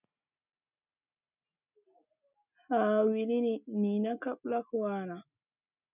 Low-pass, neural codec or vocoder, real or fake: 3.6 kHz; none; real